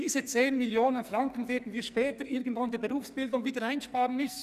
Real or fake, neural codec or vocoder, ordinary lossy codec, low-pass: fake; codec, 44.1 kHz, 2.6 kbps, SNAC; none; 14.4 kHz